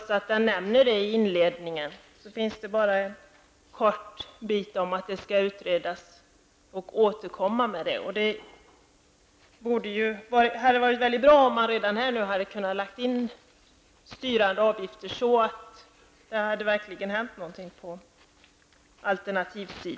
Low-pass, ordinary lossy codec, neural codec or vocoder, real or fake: none; none; none; real